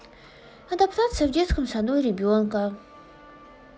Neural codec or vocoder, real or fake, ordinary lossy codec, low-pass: none; real; none; none